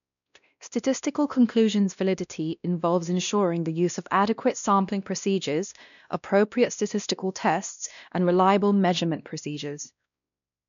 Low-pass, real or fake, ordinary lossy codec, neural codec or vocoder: 7.2 kHz; fake; none; codec, 16 kHz, 1 kbps, X-Codec, WavLM features, trained on Multilingual LibriSpeech